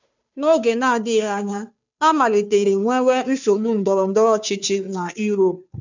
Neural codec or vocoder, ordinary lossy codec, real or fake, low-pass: codec, 16 kHz, 2 kbps, FunCodec, trained on Chinese and English, 25 frames a second; none; fake; 7.2 kHz